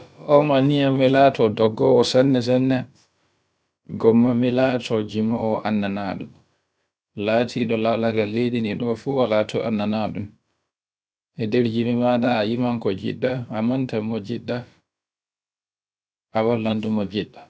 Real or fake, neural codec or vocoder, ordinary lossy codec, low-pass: fake; codec, 16 kHz, about 1 kbps, DyCAST, with the encoder's durations; none; none